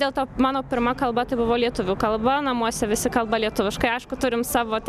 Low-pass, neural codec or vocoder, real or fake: 14.4 kHz; none; real